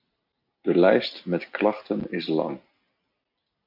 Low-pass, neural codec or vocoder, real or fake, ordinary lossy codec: 5.4 kHz; none; real; MP3, 48 kbps